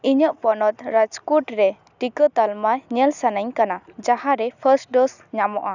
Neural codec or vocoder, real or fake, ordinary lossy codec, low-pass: none; real; none; 7.2 kHz